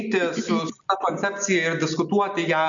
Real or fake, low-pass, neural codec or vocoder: real; 7.2 kHz; none